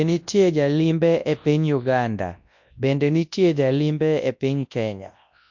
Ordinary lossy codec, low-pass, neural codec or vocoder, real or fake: MP3, 48 kbps; 7.2 kHz; codec, 24 kHz, 0.9 kbps, WavTokenizer, large speech release; fake